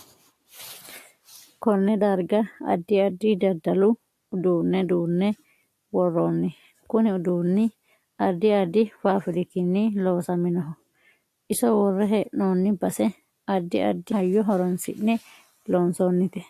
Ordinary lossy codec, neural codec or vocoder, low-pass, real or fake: AAC, 64 kbps; none; 14.4 kHz; real